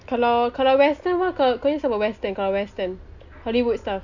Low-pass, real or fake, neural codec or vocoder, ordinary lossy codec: 7.2 kHz; real; none; none